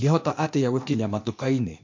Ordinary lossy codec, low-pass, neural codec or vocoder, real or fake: MP3, 48 kbps; 7.2 kHz; codec, 16 kHz, 0.8 kbps, ZipCodec; fake